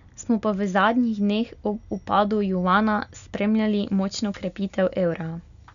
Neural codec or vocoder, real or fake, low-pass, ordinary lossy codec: none; real; 7.2 kHz; none